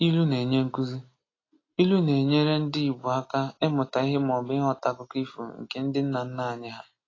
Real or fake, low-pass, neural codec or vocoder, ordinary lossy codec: real; 7.2 kHz; none; AAC, 32 kbps